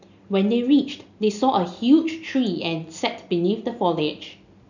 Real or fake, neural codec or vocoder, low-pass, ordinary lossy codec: real; none; 7.2 kHz; none